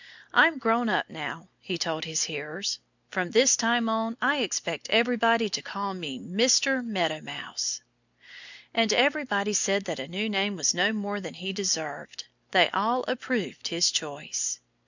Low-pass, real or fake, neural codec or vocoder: 7.2 kHz; real; none